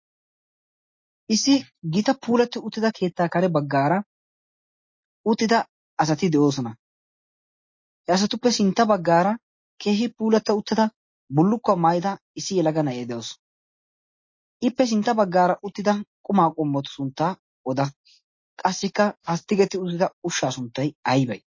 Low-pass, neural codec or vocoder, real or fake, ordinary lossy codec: 7.2 kHz; none; real; MP3, 32 kbps